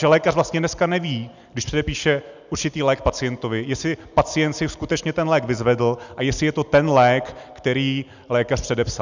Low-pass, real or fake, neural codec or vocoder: 7.2 kHz; real; none